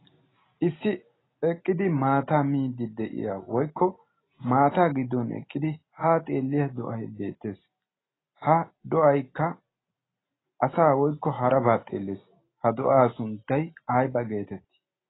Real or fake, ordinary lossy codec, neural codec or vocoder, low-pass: real; AAC, 16 kbps; none; 7.2 kHz